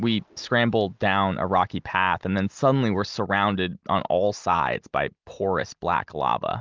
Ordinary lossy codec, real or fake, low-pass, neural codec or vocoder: Opus, 32 kbps; real; 7.2 kHz; none